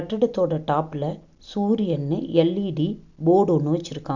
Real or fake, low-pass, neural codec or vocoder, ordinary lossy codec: real; 7.2 kHz; none; none